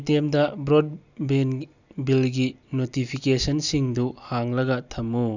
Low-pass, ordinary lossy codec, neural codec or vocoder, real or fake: 7.2 kHz; none; none; real